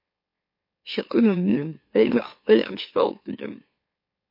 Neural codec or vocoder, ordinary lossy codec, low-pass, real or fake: autoencoder, 44.1 kHz, a latent of 192 numbers a frame, MeloTTS; MP3, 32 kbps; 5.4 kHz; fake